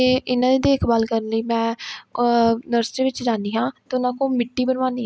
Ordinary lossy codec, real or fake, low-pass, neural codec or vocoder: none; real; none; none